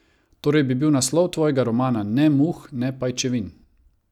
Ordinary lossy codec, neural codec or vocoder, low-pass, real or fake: none; none; 19.8 kHz; real